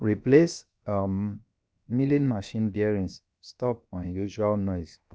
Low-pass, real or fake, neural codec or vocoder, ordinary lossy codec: none; fake; codec, 16 kHz, 0.7 kbps, FocalCodec; none